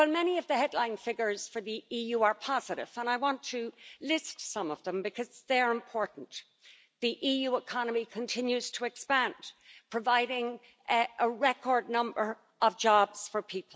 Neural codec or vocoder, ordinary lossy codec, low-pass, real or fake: none; none; none; real